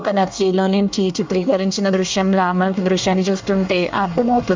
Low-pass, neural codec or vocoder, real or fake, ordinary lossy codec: 7.2 kHz; codec, 24 kHz, 1 kbps, SNAC; fake; MP3, 64 kbps